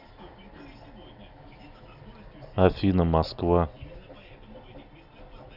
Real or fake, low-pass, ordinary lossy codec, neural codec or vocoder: real; 5.4 kHz; none; none